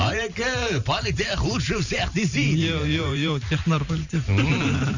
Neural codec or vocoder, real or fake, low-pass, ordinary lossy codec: vocoder, 44.1 kHz, 128 mel bands every 512 samples, BigVGAN v2; fake; 7.2 kHz; none